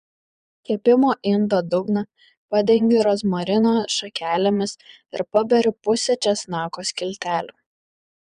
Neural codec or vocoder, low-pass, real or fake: vocoder, 22.05 kHz, 80 mel bands, Vocos; 9.9 kHz; fake